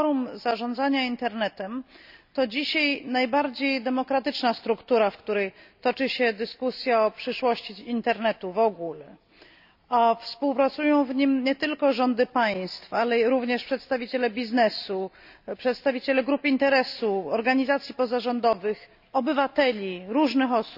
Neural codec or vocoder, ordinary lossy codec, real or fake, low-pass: none; none; real; 5.4 kHz